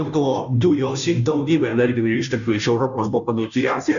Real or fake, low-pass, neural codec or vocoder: fake; 7.2 kHz; codec, 16 kHz, 0.5 kbps, FunCodec, trained on Chinese and English, 25 frames a second